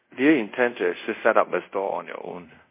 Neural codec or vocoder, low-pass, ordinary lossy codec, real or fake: codec, 24 kHz, 0.5 kbps, DualCodec; 3.6 kHz; MP3, 24 kbps; fake